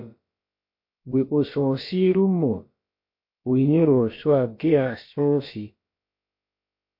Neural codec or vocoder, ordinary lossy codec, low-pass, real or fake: codec, 16 kHz, about 1 kbps, DyCAST, with the encoder's durations; MP3, 32 kbps; 5.4 kHz; fake